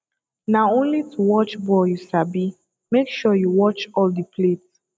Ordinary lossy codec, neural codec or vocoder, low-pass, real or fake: none; none; none; real